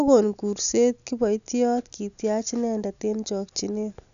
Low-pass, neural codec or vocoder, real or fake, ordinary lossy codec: 7.2 kHz; none; real; none